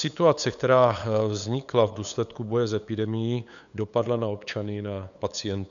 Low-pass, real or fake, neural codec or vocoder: 7.2 kHz; fake; codec, 16 kHz, 8 kbps, FunCodec, trained on LibriTTS, 25 frames a second